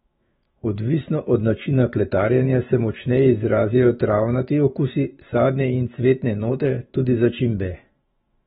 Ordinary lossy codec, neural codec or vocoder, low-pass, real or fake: AAC, 16 kbps; autoencoder, 48 kHz, 128 numbers a frame, DAC-VAE, trained on Japanese speech; 19.8 kHz; fake